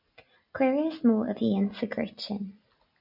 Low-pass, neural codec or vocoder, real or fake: 5.4 kHz; none; real